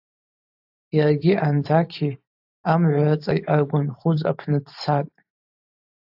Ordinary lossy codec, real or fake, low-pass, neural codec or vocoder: AAC, 48 kbps; fake; 5.4 kHz; vocoder, 44.1 kHz, 128 mel bands every 512 samples, BigVGAN v2